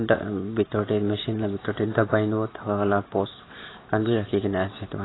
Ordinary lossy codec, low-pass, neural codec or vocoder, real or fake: AAC, 16 kbps; 7.2 kHz; none; real